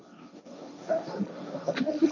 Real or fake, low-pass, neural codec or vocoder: fake; 7.2 kHz; codec, 16 kHz, 1.1 kbps, Voila-Tokenizer